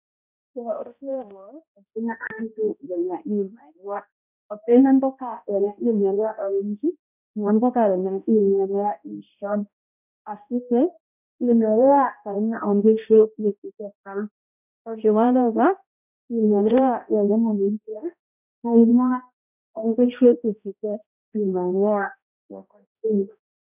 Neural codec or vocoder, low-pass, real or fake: codec, 16 kHz, 0.5 kbps, X-Codec, HuBERT features, trained on balanced general audio; 3.6 kHz; fake